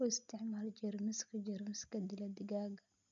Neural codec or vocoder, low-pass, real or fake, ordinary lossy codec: none; 7.2 kHz; real; none